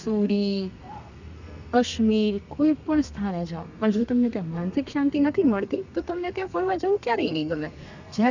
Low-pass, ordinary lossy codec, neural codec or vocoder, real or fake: 7.2 kHz; none; codec, 32 kHz, 1.9 kbps, SNAC; fake